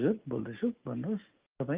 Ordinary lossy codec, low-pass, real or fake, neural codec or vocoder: Opus, 32 kbps; 3.6 kHz; real; none